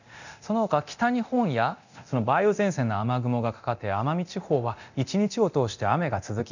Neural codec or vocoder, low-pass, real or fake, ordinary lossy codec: codec, 24 kHz, 0.9 kbps, DualCodec; 7.2 kHz; fake; none